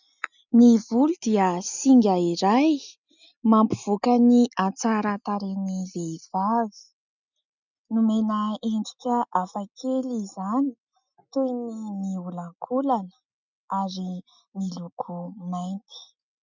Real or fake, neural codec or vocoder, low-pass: real; none; 7.2 kHz